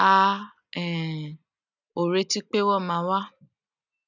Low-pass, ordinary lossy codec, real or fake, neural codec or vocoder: 7.2 kHz; none; real; none